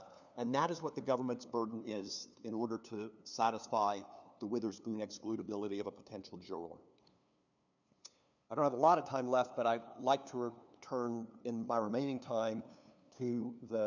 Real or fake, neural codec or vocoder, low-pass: fake; codec, 16 kHz, 2 kbps, FunCodec, trained on LibriTTS, 25 frames a second; 7.2 kHz